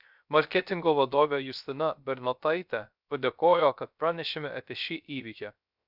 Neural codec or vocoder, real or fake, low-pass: codec, 16 kHz, 0.3 kbps, FocalCodec; fake; 5.4 kHz